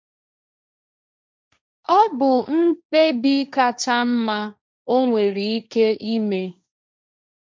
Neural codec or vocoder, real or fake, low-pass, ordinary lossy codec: codec, 16 kHz, 1.1 kbps, Voila-Tokenizer; fake; none; none